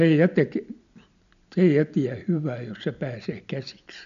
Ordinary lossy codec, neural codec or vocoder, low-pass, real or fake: none; none; 7.2 kHz; real